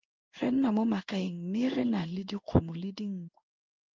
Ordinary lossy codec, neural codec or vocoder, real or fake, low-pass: Opus, 32 kbps; codec, 16 kHz in and 24 kHz out, 1 kbps, XY-Tokenizer; fake; 7.2 kHz